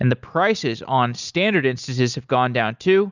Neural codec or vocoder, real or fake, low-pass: none; real; 7.2 kHz